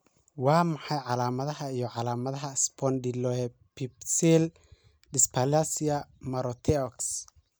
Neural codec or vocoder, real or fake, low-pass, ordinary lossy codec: none; real; none; none